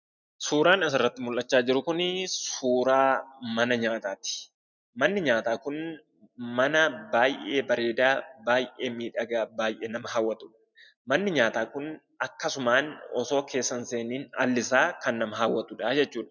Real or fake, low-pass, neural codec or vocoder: real; 7.2 kHz; none